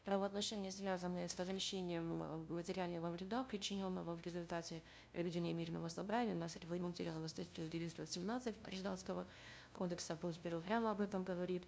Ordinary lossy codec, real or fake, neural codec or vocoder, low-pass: none; fake; codec, 16 kHz, 0.5 kbps, FunCodec, trained on LibriTTS, 25 frames a second; none